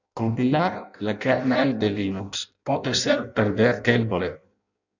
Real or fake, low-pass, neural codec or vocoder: fake; 7.2 kHz; codec, 16 kHz in and 24 kHz out, 0.6 kbps, FireRedTTS-2 codec